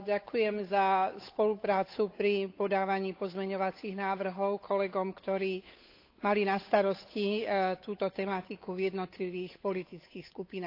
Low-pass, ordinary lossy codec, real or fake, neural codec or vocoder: 5.4 kHz; AAC, 32 kbps; fake; codec, 16 kHz, 8 kbps, FunCodec, trained on Chinese and English, 25 frames a second